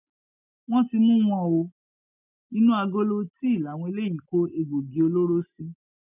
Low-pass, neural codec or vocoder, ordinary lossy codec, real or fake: 3.6 kHz; none; none; real